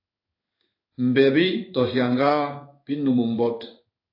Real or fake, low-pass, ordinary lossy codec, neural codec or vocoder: fake; 5.4 kHz; AAC, 32 kbps; codec, 16 kHz in and 24 kHz out, 1 kbps, XY-Tokenizer